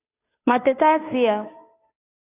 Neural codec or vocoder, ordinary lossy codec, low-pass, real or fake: codec, 16 kHz, 2 kbps, FunCodec, trained on Chinese and English, 25 frames a second; AAC, 24 kbps; 3.6 kHz; fake